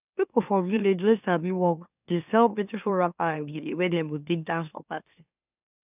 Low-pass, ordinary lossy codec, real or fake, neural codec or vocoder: 3.6 kHz; none; fake; autoencoder, 44.1 kHz, a latent of 192 numbers a frame, MeloTTS